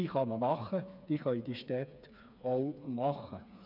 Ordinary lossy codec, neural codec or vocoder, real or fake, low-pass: none; codec, 16 kHz, 4 kbps, FreqCodec, smaller model; fake; 5.4 kHz